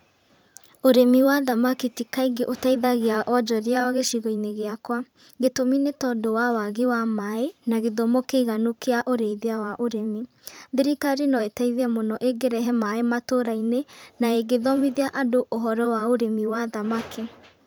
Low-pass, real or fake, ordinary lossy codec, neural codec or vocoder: none; fake; none; vocoder, 44.1 kHz, 128 mel bands every 512 samples, BigVGAN v2